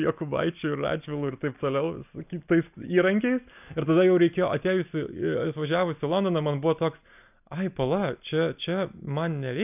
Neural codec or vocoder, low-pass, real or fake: none; 3.6 kHz; real